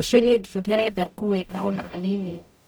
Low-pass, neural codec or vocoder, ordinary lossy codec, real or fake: none; codec, 44.1 kHz, 0.9 kbps, DAC; none; fake